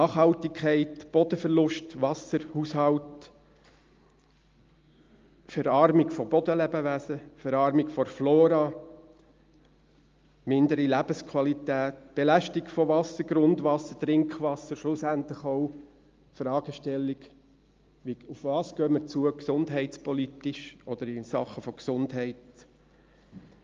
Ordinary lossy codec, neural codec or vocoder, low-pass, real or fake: Opus, 32 kbps; none; 7.2 kHz; real